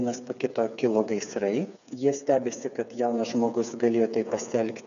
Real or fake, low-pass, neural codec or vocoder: fake; 7.2 kHz; codec, 16 kHz, 4 kbps, FreqCodec, smaller model